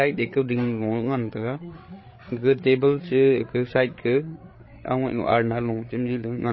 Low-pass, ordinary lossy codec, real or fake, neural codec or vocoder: 7.2 kHz; MP3, 24 kbps; fake; codec, 16 kHz, 8 kbps, FreqCodec, larger model